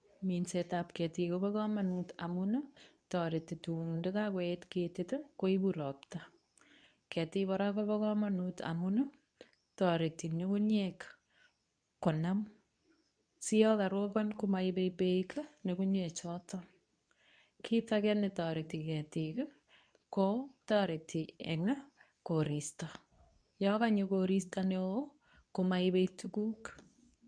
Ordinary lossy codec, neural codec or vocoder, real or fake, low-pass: none; codec, 24 kHz, 0.9 kbps, WavTokenizer, medium speech release version 2; fake; 9.9 kHz